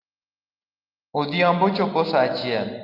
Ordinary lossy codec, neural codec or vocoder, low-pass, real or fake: Opus, 32 kbps; none; 5.4 kHz; real